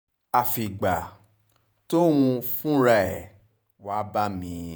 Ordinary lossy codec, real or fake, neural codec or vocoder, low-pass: none; fake; vocoder, 48 kHz, 128 mel bands, Vocos; none